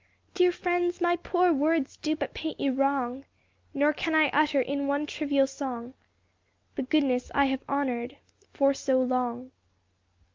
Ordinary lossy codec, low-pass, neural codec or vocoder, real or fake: Opus, 24 kbps; 7.2 kHz; none; real